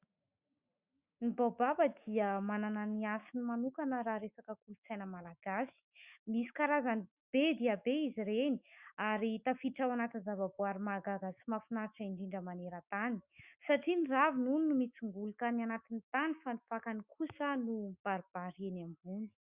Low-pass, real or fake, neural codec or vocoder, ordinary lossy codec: 3.6 kHz; fake; autoencoder, 48 kHz, 128 numbers a frame, DAC-VAE, trained on Japanese speech; Opus, 64 kbps